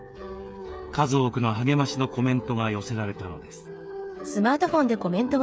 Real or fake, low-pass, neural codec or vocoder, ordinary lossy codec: fake; none; codec, 16 kHz, 8 kbps, FreqCodec, smaller model; none